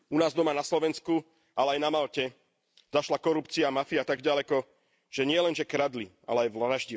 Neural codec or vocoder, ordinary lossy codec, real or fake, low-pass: none; none; real; none